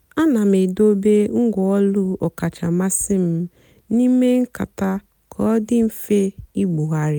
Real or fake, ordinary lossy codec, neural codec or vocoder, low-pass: real; none; none; none